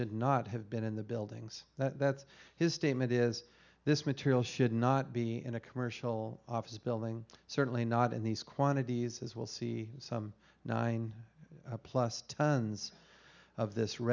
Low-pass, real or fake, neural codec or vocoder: 7.2 kHz; real; none